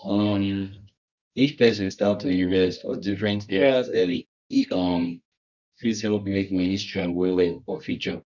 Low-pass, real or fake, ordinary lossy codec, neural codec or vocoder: 7.2 kHz; fake; none; codec, 24 kHz, 0.9 kbps, WavTokenizer, medium music audio release